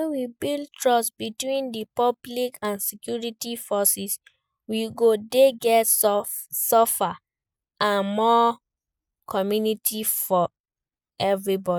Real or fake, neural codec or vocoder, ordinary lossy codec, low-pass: real; none; none; none